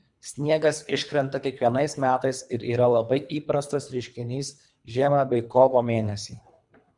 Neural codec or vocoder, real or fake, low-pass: codec, 24 kHz, 3 kbps, HILCodec; fake; 10.8 kHz